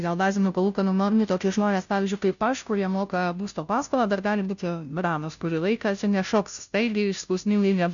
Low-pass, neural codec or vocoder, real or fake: 7.2 kHz; codec, 16 kHz, 0.5 kbps, FunCodec, trained on Chinese and English, 25 frames a second; fake